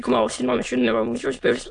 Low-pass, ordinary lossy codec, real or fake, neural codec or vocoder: 9.9 kHz; AAC, 64 kbps; fake; autoencoder, 22.05 kHz, a latent of 192 numbers a frame, VITS, trained on many speakers